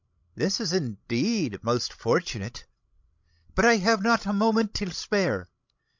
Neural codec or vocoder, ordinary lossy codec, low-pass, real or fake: codec, 16 kHz, 16 kbps, FreqCodec, larger model; MP3, 64 kbps; 7.2 kHz; fake